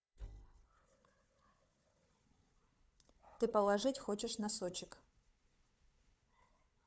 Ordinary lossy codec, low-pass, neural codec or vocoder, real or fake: none; none; codec, 16 kHz, 4 kbps, FunCodec, trained on Chinese and English, 50 frames a second; fake